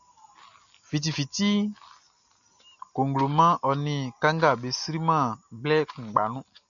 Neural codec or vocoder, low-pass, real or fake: none; 7.2 kHz; real